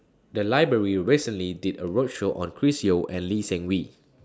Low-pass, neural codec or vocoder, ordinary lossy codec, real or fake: none; none; none; real